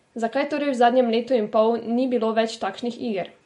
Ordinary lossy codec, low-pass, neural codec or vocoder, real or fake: MP3, 48 kbps; 19.8 kHz; none; real